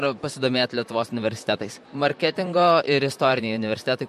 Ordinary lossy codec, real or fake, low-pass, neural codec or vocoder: MP3, 64 kbps; fake; 14.4 kHz; vocoder, 44.1 kHz, 128 mel bands, Pupu-Vocoder